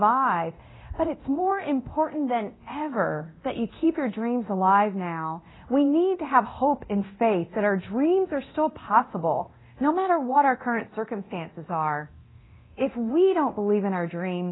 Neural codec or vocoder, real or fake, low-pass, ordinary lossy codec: codec, 24 kHz, 0.9 kbps, DualCodec; fake; 7.2 kHz; AAC, 16 kbps